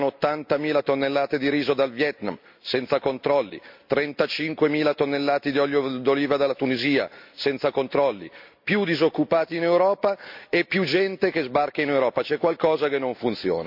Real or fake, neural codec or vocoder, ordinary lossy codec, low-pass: real; none; MP3, 48 kbps; 5.4 kHz